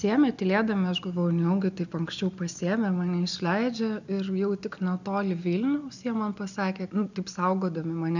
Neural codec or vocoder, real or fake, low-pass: none; real; 7.2 kHz